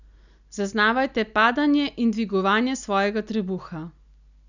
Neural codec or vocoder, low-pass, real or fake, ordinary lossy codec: none; 7.2 kHz; real; none